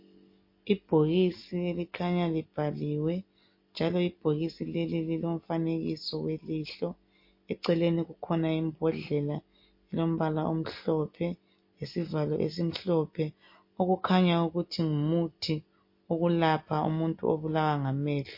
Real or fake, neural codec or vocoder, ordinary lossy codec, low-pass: real; none; MP3, 32 kbps; 5.4 kHz